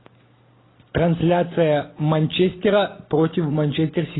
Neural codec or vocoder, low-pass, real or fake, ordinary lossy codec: none; 7.2 kHz; real; AAC, 16 kbps